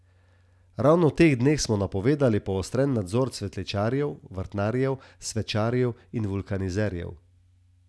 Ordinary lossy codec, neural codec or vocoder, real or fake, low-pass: none; none; real; none